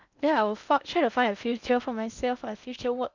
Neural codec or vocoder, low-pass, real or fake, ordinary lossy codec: codec, 16 kHz in and 24 kHz out, 0.8 kbps, FocalCodec, streaming, 65536 codes; 7.2 kHz; fake; none